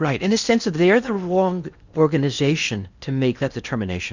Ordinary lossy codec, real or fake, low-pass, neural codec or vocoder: Opus, 64 kbps; fake; 7.2 kHz; codec, 16 kHz in and 24 kHz out, 0.8 kbps, FocalCodec, streaming, 65536 codes